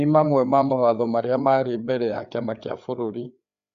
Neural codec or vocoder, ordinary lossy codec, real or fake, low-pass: codec, 16 kHz, 4 kbps, FreqCodec, larger model; Opus, 64 kbps; fake; 7.2 kHz